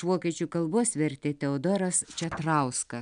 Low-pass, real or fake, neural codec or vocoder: 9.9 kHz; real; none